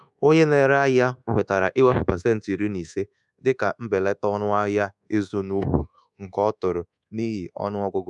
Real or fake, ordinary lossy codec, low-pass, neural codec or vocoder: fake; none; 10.8 kHz; codec, 24 kHz, 1.2 kbps, DualCodec